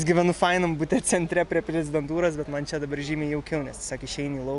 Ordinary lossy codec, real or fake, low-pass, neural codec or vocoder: AAC, 96 kbps; real; 10.8 kHz; none